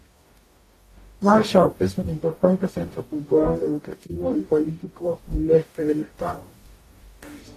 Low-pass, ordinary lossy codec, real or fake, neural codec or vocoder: 14.4 kHz; AAC, 48 kbps; fake; codec, 44.1 kHz, 0.9 kbps, DAC